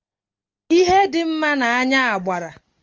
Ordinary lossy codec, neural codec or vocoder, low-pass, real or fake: Opus, 32 kbps; none; 7.2 kHz; real